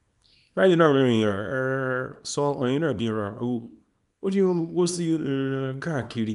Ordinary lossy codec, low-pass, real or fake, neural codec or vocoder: none; 10.8 kHz; fake; codec, 24 kHz, 0.9 kbps, WavTokenizer, small release